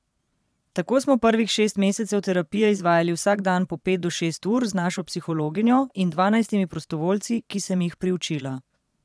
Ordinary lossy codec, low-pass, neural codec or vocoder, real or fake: none; none; vocoder, 22.05 kHz, 80 mel bands, Vocos; fake